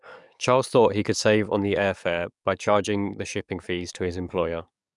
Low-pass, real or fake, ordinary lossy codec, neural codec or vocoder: 10.8 kHz; fake; none; codec, 44.1 kHz, 7.8 kbps, DAC